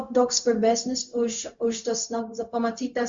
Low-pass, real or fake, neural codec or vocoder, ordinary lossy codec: 7.2 kHz; fake; codec, 16 kHz, 0.4 kbps, LongCat-Audio-Codec; Opus, 64 kbps